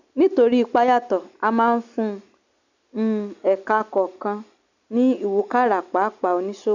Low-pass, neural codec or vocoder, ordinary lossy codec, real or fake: 7.2 kHz; none; none; real